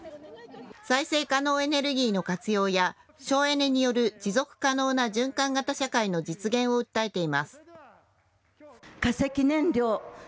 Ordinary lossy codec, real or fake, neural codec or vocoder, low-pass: none; real; none; none